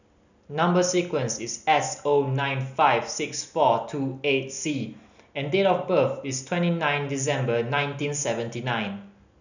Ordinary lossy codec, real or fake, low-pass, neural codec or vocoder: none; real; 7.2 kHz; none